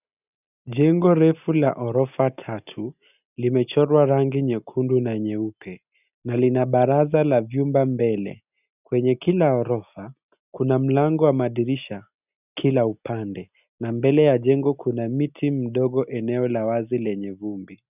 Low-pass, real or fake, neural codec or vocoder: 3.6 kHz; real; none